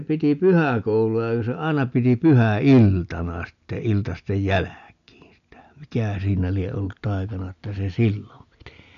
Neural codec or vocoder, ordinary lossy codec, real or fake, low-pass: none; none; real; 7.2 kHz